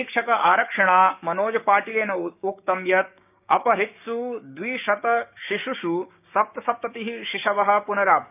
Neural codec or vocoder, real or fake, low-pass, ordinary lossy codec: codec, 16 kHz, 6 kbps, DAC; fake; 3.6 kHz; none